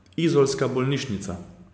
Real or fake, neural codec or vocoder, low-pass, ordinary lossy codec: real; none; none; none